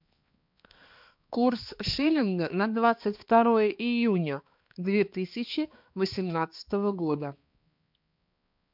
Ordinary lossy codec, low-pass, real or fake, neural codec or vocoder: MP3, 48 kbps; 5.4 kHz; fake; codec, 16 kHz, 2 kbps, X-Codec, HuBERT features, trained on balanced general audio